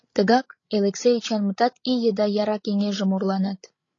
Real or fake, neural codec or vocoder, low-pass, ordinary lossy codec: fake; codec, 16 kHz, 16 kbps, FreqCodec, larger model; 7.2 kHz; AAC, 32 kbps